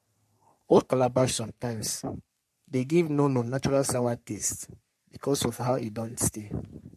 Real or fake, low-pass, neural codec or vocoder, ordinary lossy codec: fake; 14.4 kHz; codec, 44.1 kHz, 3.4 kbps, Pupu-Codec; MP3, 64 kbps